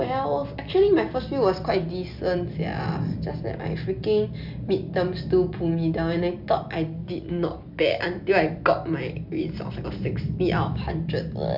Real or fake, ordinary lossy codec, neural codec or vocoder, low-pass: real; none; none; 5.4 kHz